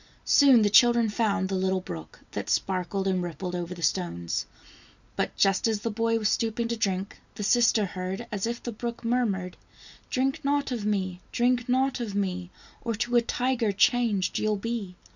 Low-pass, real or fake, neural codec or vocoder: 7.2 kHz; real; none